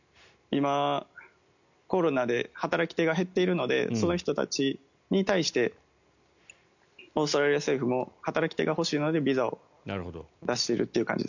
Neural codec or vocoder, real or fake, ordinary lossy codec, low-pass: none; real; none; 7.2 kHz